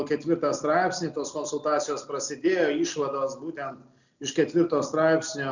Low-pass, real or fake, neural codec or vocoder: 7.2 kHz; real; none